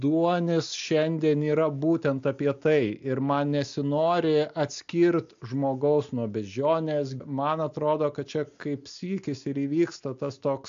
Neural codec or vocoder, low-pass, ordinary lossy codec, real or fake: none; 7.2 kHz; AAC, 64 kbps; real